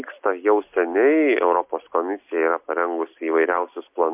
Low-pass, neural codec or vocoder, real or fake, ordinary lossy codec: 3.6 kHz; none; real; MP3, 32 kbps